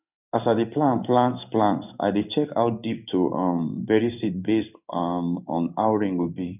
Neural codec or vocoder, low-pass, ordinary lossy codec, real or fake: codec, 16 kHz in and 24 kHz out, 1 kbps, XY-Tokenizer; 3.6 kHz; none; fake